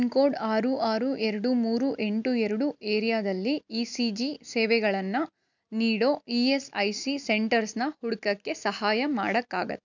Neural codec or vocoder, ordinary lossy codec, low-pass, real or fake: none; none; 7.2 kHz; real